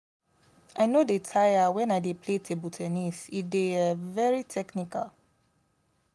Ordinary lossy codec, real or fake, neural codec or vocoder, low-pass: none; real; none; none